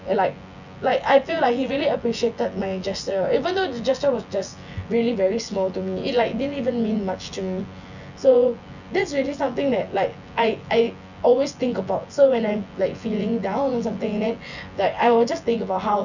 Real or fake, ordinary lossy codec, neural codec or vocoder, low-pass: fake; none; vocoder, 24 kHz, 100 mel bands, Vocos; 7.2 kHz